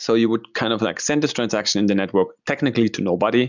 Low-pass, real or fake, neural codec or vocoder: 7.2 kHz; real; none